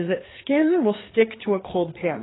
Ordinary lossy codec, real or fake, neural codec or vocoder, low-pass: AAC, 16 kbps; fake; codec, 16 kHz, 4 kbps, FreqCodec, larger model; 7.2 kHz